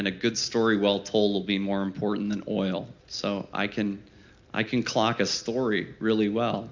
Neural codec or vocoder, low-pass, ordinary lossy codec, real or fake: none; 7.2 kHz; MP3, 64 kbps; real